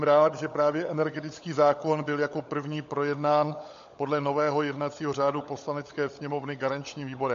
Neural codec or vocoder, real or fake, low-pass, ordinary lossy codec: codec, 16 kHz, 16 kbps, FunCodec, trained on LibriTTS, 50 frames a second; fake; 7.2 kHz; MP3, 48 kbps